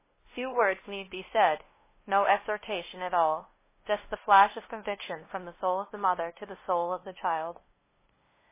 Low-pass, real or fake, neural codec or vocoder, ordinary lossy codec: 3.6 kHz; fake; codec, 16 kHz, 0.5 kbps, FunCodec, trained on LibriTTS, 25 frames a second; MP3, 16 kbps